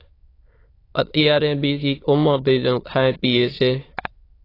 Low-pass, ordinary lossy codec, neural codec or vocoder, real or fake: 5.4 kHz; AAC, 24 kbps; autoencoder, 22.05 kHz, a latent of 192 numbers a frame, VITS, trained on many speakers; fake